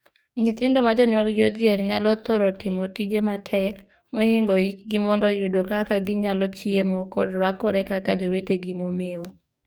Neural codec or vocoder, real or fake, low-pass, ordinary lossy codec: codec, 44.1 kHz, 2.6 kbps, DAC; fake; none; none